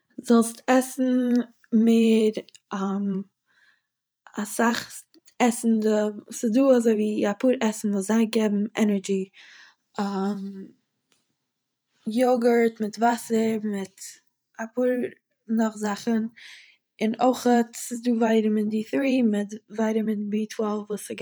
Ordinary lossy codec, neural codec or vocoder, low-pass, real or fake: none; vocoder, 44.1 kHz, 128 mel bands every 512 samples, BigVGAN v2; none; fake